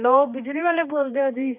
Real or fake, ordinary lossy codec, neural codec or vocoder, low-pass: fake; none; codec, 16 kHz, 2 kbps, X-Codec, HuBERT features, trained on balanced general audio; 3.6 kHz